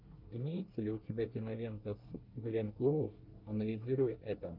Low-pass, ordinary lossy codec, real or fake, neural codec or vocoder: 5.4 kHz; Opus, 32 kbps; fake; codec, 24 kHz, 1 kbps, SNAC